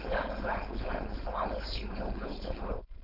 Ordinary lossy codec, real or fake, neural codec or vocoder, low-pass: none; fake; codec, 16 kHz, 4.8 kbps, FACodec; 5.4 kHz